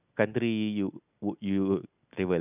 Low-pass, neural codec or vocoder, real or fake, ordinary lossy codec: 3.6 kHz; none; real; none